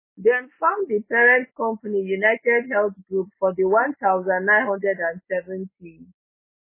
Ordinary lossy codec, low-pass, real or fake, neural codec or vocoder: MP3, 16 kbps; 3.6 kHz; real; none